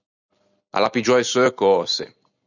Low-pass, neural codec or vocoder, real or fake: 7.2 kHz; none; real